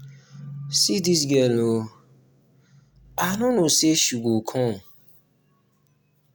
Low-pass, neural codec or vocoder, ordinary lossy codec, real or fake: none; none; none; real